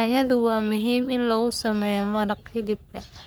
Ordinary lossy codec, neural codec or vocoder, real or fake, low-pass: none; codec, 44.1 kHz, 3.4 kbps, Pupu-Codec; fake; none